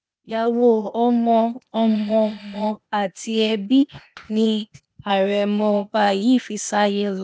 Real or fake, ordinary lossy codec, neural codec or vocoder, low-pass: fake; none; codec, 16 kHz, 0.8 kbps, ZipCodec; none